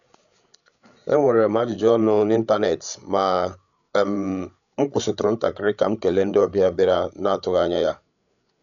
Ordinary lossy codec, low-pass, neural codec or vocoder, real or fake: none; 7.2 kHz; codec, 16 kHz, 8 kbps, FreqCodec, larger model; fake